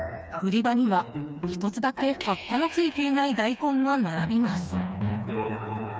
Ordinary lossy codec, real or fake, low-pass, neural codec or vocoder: none; fake; none; codec, 16 kHz, 2 kbps, FreqCodec, smaller model